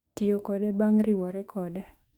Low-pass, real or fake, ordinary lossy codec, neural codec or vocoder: 19.8 kHz; fake; Opus, 64 kbps; autoencoder, 48 kHz, 32 numbers a frame, DAC-VAE, trained on Japanese speech